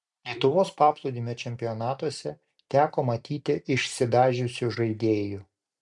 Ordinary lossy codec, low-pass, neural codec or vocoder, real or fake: MP3, 64 kbps; 10.8 kHz; vocoder, 44.1 kHz, 128 mel bands every 256 samples, BigVGAN v2; fake